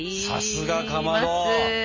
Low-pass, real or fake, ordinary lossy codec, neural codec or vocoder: 7.2 kHz; real; MP3, 32 kbps; none